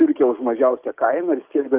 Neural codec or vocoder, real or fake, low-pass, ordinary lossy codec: codec, 44.1 kHz, 7.8 kbps, DAC; fake; 3.6 kHz; Opus, 32 kbps